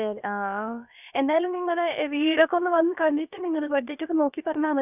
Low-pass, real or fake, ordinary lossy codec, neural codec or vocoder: 3.6 kHz; fake; none; codec, 16 kHz, about 1 kbps, DyCAST, with the encoder's durations